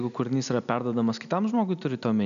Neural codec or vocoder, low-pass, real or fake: none; 7.2 kHz; real